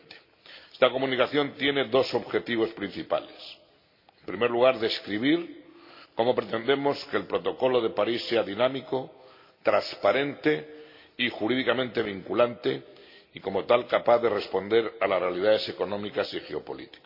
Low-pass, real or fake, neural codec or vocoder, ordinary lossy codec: 5.4 kHz; real; none; MP3, 32 kbps